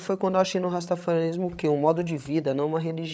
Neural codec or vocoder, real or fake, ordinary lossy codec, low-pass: codec, 16 kHz, 8 kbps, FreqCodec, larger model; fake; none; none